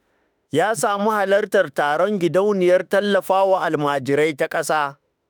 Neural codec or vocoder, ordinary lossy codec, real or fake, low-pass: autoencoder, 48 kHz, 32 numbers a frame, DAC-VAE, trained on Japanese speech; none; fake; none